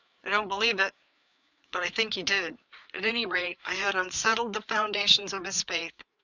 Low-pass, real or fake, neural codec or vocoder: 7.2 kHz; fake; codec, 16 kHz, 4 kbps, FreqCodec, larger model